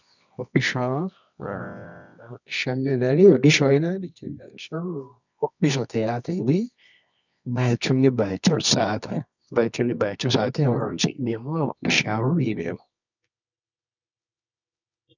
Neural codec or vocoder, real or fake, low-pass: codec, 24 kHz, 0.9 kbps, WavTokenizer, medium music audio release; fake; 7.2 kHz